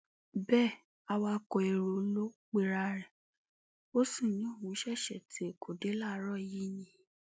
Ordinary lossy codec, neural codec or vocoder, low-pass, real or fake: none; none; none; real